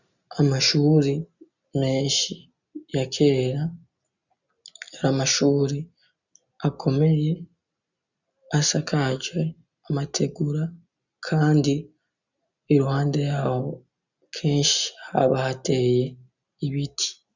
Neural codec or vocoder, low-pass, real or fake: none; 7.2 kHz; real